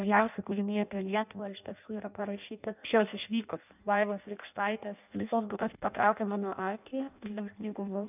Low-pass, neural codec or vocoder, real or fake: 3.6 kHz; codec, 16 kHz in and 24 kHz out, 0.6 kbps, FireRedTTS-2 codec; fake